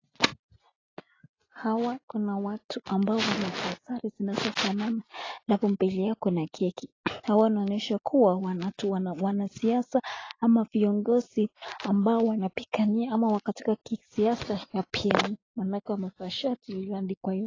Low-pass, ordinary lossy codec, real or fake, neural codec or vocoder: 7.2 kHz; AAC, 32 kbps; real; none